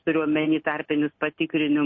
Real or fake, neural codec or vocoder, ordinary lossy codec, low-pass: real; none; MP3, 32 kbps; 7.2 kHz